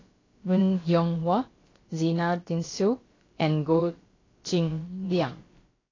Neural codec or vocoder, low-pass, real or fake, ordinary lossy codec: codec, 16 kHz, about 1 kbps, DyCAST, with the encoder's durations; 7.2 kHz; fake; AAC, 32 kbps